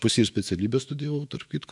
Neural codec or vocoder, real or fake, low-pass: autoencoder, 48 kHz, 128 numbers a frame, DAC-VAE, trained on Japanese speech; fake; 10.8 kHz